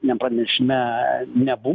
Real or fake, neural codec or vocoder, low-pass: real; none; 7.2 kHz